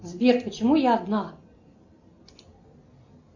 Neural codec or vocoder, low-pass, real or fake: none; 7.2 kHz; real